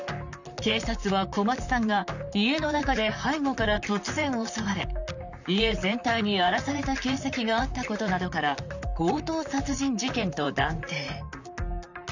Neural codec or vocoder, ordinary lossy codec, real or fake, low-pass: vocoder, 44.1 kHz, 128 mel bands, Pupu-Vocoder; none; fake; 7.2 kHz